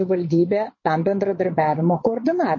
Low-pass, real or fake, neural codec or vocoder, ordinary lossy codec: 7.2 kHz; fake; vocoder, 22.05 kHz, 80 mel bands, Vocos; MP3, 32 kbps